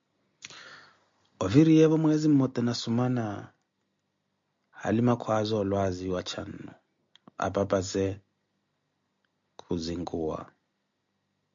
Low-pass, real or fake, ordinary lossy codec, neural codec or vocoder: 7.2 kHz; real; MP3, 48 kbps; none